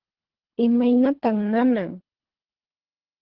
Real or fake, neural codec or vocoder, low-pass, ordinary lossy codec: fake; codec, 24 kHz, 3 kbps, HILCodec; 5.4 kHz; Opus, 16 kbps